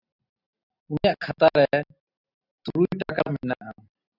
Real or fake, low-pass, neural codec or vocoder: real; 5.4 kHz; none